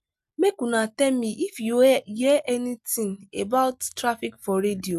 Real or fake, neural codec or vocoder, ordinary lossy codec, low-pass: real; none; none; 14.4 kHz